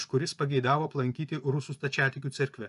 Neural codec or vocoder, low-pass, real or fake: vocoder, 24 kHz, 100 mel bands, Vocos; 10.8 kHz; fake